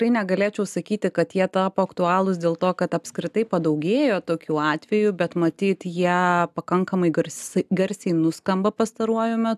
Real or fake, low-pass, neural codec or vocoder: real; 14.4 kHz; none